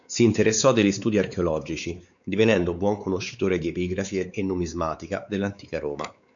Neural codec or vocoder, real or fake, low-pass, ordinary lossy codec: codec, 16 kHz, 4 kbps, X-Codec, WavLM features, trained on Multilingual LibriSpeech; fake; 7.2 kHz; MP3, 96 kbps